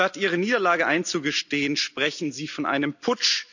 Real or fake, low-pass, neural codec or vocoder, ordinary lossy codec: real; 7.2 kHz; none; none